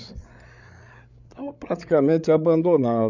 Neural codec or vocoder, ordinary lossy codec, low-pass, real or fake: codec, 16 kHz, 8 kbps, FreqCodec, larger model; none; 7.2 kHz; fake